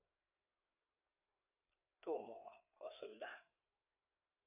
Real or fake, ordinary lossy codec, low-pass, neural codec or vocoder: fake; none; 3.6 kHz; codec, 16 kHz, 4 kbps, FreqCodec, larger model